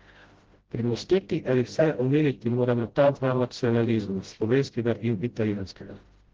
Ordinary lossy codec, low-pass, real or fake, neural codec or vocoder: Opus, 16 kbps; 7.2 kHz; fake; codec, 16 kHz, 0.5 kbps, FreqCodec, smaller model